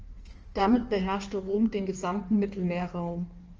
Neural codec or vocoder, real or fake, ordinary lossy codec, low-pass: codec, 16 kHz in and 24 kHz out, 1.1 kbps, FireRedTTS-2 codec; fake; Opus, 24 kbps; 7.2 kHz